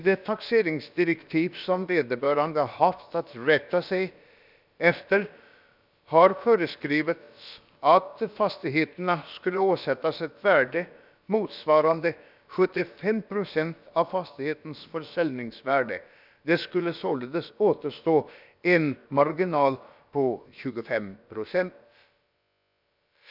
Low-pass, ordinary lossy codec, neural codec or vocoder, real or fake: 5.4 kHz; none; codec, 16 kHz, about 1 kbps, DyCAST, with the encoder's durations; fake